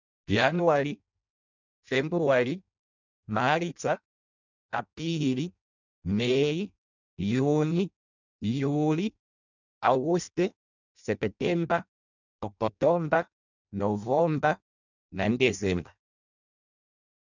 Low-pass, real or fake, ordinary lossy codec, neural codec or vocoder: 7.2 kHz; fake; none; codec, 16 kHz in and 24 kHz out, 0.6 kbps, FireRedTTS-2 codec